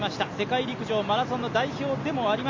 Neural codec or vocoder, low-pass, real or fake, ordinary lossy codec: none; 7.2 kHz; real; MP3, 48 kbps